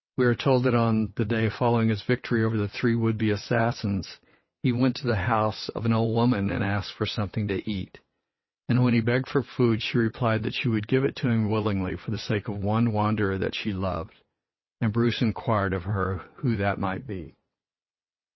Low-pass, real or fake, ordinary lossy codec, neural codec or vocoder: 7.2 kHz; fake; MP3, 24 kbps; vocoder, 22.05 kHz, 80 mel bands, WaveNeXt